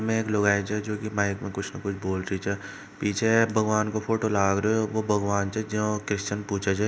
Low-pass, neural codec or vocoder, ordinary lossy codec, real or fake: none; none; none; real